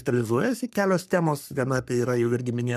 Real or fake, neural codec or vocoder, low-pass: fake; codec, 44.1 kHz, 3.4 kbps, Pupu-Codec; 14.4 kHz